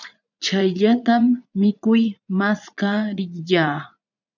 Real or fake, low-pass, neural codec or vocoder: fake; 7.2 kHz; vocoder, 44.1 kHz, 80 mel bands, Vocos